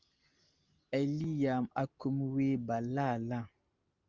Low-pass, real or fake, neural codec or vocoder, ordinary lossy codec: 7.2 kHz; real; none; Opus, 32 kbps